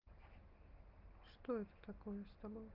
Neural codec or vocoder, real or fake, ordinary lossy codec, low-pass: none; real; Opus, 24 kbps; 5.4 kHz